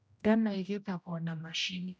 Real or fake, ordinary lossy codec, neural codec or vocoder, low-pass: fake; none; codec, 16 kHz, 0.5 kbps, X-Codec, HuBERT features, trained on general audio; none